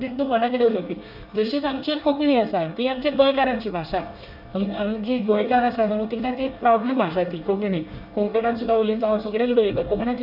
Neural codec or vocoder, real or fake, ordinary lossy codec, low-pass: codec, 24 kHz, 1 kbps, SNAC; fake; none; 5.4 kHz